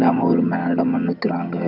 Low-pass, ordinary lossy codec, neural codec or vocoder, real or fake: 5.4 kHz; none; vocoder, 22.05 kHz, 80 mel bands, HiFi-GAN; fake